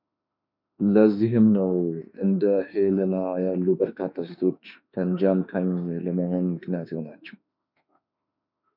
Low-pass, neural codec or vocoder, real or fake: 5.4 kHz; autoencoder, 48 kHz, 32 numbers a frame, DAC-VAE, trained on Japanese speech; fake